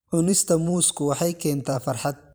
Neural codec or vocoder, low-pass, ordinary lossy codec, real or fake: vocoder, 44.1 kHz, 128 mel bands every 512 samples, BigVGAN v2; none; none; fake